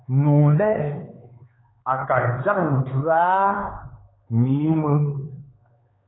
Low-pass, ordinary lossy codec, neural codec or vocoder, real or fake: 7.2 kHz; AAC, 16 kbps; codec, 16 kHz, 4 kbps, X-Codec, HuBERT features, trained on LibriSpeech; fake